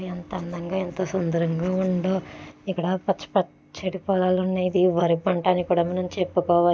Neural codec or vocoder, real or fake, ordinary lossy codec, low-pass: none; real; none; none